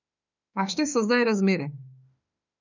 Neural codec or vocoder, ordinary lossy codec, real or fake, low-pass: autoencoder, 48 kHz, 32 numbers a frame, DAC-VAE, trained on Japanese speech; none; fake; 7.2 kHz